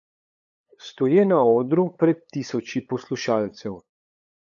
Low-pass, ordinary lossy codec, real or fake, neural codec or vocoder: 7.2 kHz; none; fake; codec, 16 kHz, 8 kbps, FunCodec, trained on LibriTTS, 25 frames a second